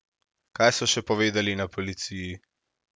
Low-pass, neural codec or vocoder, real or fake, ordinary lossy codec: none; none; real; none